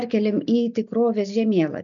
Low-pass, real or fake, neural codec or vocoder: 7.2 kHz; real; none